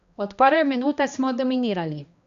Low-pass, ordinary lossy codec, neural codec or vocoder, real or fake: 7.2 kHz; Opus, 64 kbps; codec, 16 kHz, 2 kbps, X-Codec, HuBERT features, trained on balanced general audio; fake